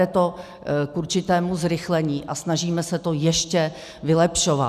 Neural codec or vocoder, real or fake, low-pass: none; real; 14.4 kHz